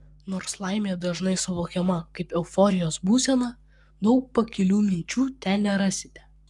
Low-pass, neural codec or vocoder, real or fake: 10.8 kHz; codec, 44.1 kHz, 7.8 kbps, Pupu-Codec; fake